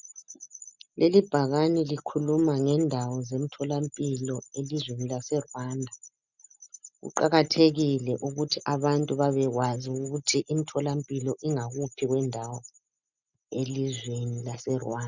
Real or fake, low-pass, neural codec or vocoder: real; 7.2 kHz; none